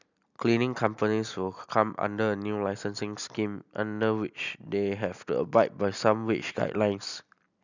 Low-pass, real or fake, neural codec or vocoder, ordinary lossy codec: 7.2 kHz; real; none; none